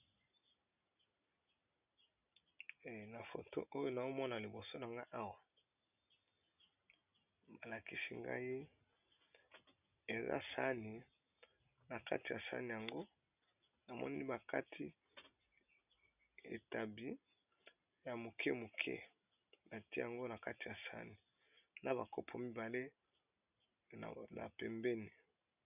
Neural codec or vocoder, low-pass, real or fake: none; 3.6 kHz; real